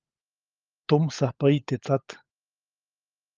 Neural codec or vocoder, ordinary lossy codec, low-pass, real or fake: codec, 16 kHz, 16 kbps, FunCodec, trained on LibriTTS, 50 frames a second; Opus, 24 kbps; 7.2 kHz; fake